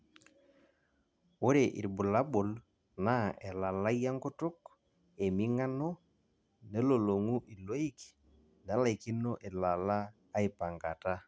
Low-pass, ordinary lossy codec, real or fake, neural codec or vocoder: none; none; real; none